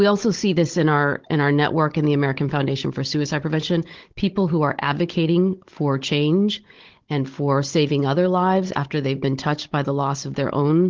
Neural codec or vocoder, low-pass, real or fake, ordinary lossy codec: codec, 16 kHz, 16 kbps, FunCodec, trained on Chinese and English, 50 frames a second; 7.2 kHz; fake; Opus, 16 kbps